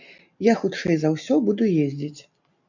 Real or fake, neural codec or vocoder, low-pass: real; none; 7.2 kHz